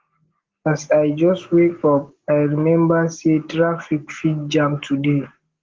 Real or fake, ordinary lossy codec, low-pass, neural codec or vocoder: real; Opus, 16 kbps; 7.2 kHz; none